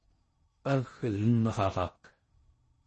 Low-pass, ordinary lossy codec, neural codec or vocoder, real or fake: 10.8 kHz; MP3, 32 kbps; codec, 16 kHz in and 24 kHz out, 0.6 kbps, FocalCodec, streaming, 4096 codes; fake